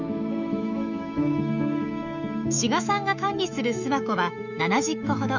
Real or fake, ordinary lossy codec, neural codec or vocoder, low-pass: fake; none; autoencoder, 48 kHz, 128 numbers a frame, DAC-VAE, trained on Japanese speech; 7.2 kHz